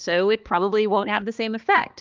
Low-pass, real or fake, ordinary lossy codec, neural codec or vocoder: 7.2 kHz; fake; Opus, 32 kbps; codec, 16 kHz, 2 kbps, X-Codec, HuBERT features, trained on balanced general audio